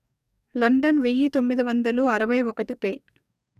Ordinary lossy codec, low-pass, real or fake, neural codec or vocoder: none; 14.4 kHz; fake; codec, 44.1 kHz, 2.6 kbps, DAC